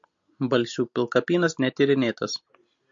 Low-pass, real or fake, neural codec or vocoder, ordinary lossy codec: 7.2 kHz; real; none; MP3, 48 kbps